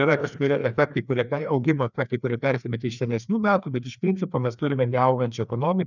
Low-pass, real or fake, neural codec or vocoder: 7.2 kHz; fake; codec, 16 kHz, 2 kbps, FreqCodec, larger model